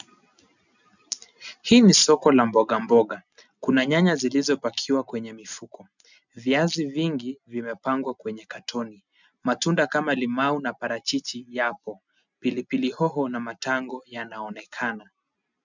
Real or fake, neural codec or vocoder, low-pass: real; none; 7.2 kHz